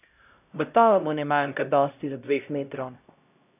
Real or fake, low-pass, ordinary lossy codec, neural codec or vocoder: fake; 3.6 kHz; none; codec, 16 kHz, 0.5 kbps, X-Codec, HuBERT features, trained on LibriSpeech